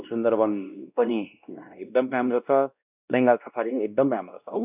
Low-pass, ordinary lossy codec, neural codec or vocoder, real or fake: 3.6 kHz; none; codec, 16 kHz, 1 kbps, X-Codec, WavLM features, trained on Multilingual LibriSpeech; fake